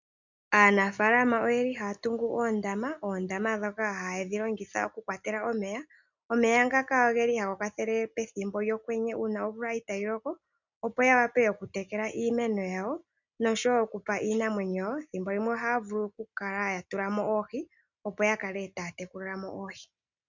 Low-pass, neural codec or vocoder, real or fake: 7.2 kHz; none; real